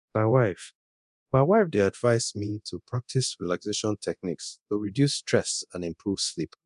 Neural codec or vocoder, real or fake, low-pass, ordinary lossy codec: codec, 24 kHz, 0.9 kbps, DualCodec; fake; 10.8 kHz; none